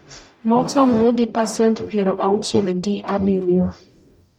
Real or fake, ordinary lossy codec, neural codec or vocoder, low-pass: fake; none; codec, 44.1 kHz, 0.9 kbps, DAC; 19.8 kHz